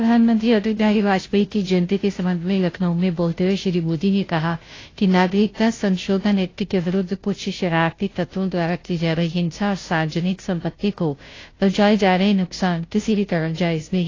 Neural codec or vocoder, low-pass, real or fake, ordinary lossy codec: codec, 16 kHz, 0.5 kbps, FunCodec, trained on Chinese and English, 25 frames a second; 7.2 kHz; fake; AAC, 32 kbps